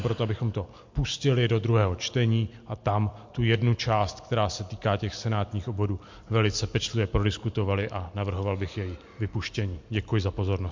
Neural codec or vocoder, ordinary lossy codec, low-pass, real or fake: none; MP3, 48 kbps; 7.2 kHz; real